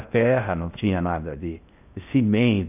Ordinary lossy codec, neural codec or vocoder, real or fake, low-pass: none; codec, 16 kHz in and 24 kHz out, 0.6 kbps, FocalCodec, streaming, 4096 codes; fake; 3.6 kHz